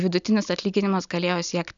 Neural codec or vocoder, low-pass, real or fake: none; 7.2 kHz; real